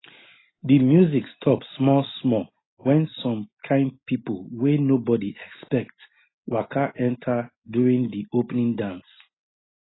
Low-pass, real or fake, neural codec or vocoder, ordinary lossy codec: 7.2 kHz; real; none; AAC, 16 kbps